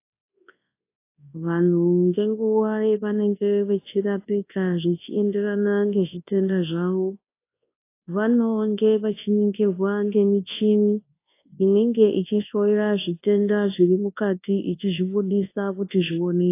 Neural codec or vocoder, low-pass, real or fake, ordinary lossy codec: codec, 24 kHz, 0.9 kbps, WavTokenizer, large speech release; 3.6 kHz; fake; AAC, 24 kbps